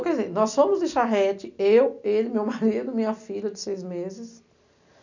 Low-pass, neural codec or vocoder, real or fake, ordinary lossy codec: 7.2 kHz; none; real; none